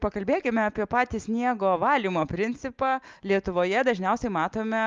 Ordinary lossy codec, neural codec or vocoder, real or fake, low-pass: Opus, 32 kbps; none; real; 7.2 kHz